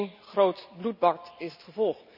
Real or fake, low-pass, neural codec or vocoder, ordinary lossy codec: real; 5.4 kHz; none; none